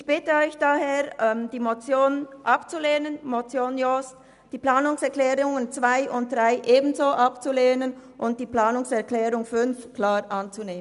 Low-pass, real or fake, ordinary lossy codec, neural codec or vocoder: 10.8 kHz; real; none; none